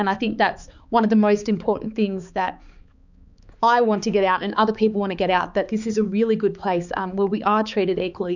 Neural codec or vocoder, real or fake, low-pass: codec, 16 kHz, 4 kbps, X-Codec, HuBERT features, trained on balanced general audio; fake; 7.2 kHz